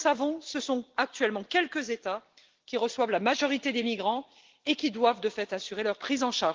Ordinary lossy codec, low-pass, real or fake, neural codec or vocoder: Opus, 16 kbps; 7.2 kHz; real; none